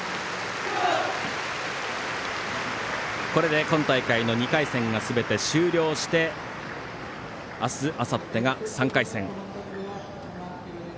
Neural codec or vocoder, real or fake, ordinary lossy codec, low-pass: none; real; none; none